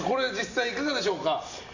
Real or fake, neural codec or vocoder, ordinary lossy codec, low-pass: real; none; none; 7.2 kHz